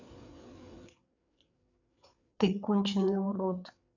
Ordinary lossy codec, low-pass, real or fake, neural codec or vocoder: none; 7.2 kHz; fake; codec, 16 kHz, 4 kbps, FreqCodec, larger model